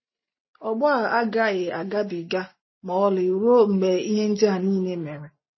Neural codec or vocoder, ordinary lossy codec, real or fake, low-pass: none; MP3, 24 kbps; real; 7.2 kHz